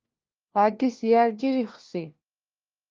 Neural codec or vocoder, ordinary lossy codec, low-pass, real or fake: codec, 16 kHz, 1 kbps, FunCodec, trained on LibriTTS, 50 frames a second; Opus, 24 kbps; 7.2 kHz; fake